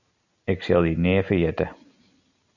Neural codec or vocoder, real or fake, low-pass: none; real; 7.2 kHz